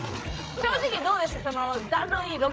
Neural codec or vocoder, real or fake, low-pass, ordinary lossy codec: codec, 16 kHz, 8 kbps, FreqCodec, larger model; fake; none; none